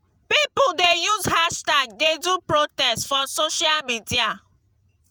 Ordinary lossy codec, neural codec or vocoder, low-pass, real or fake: none; vocoder, 48 kHz, 128 mel bands, Vocos; none; fake